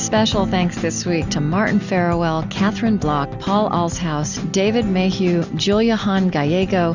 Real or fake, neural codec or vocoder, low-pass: real; none; 7.2 kHz